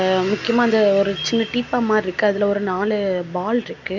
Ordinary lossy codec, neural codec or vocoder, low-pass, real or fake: none; none; 7.2 kHz; real